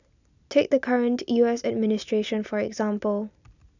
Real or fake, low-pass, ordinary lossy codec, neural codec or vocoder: real; 7.2 kHz; none; none